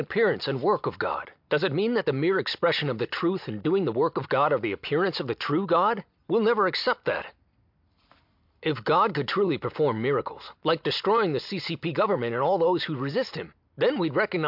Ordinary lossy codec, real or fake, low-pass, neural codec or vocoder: MP3, 48 kbps; real; 5.4 kHz; none